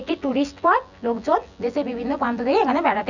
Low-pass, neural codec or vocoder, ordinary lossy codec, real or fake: 7.2 kHz; vocoder, 24 kHz, 100 mel bands, Vocos; none; fake